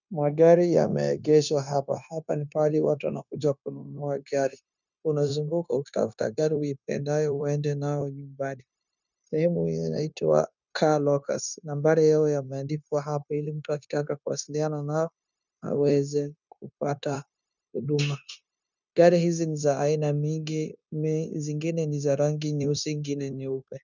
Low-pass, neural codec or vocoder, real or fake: 7.2 kHz; codec, 16 kHz, 0.9 kbps, LongCat-Audio-Codec; fake